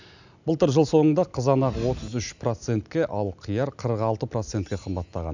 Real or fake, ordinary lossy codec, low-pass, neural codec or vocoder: real; none; 7.2 kHz; none